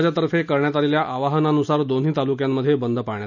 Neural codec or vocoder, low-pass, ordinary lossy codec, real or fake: none; 7.2 kHz; none; real